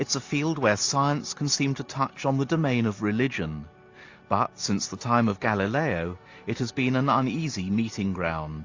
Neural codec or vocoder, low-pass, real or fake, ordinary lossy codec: none; 7.2 kHz; real; AAC, 48 kbps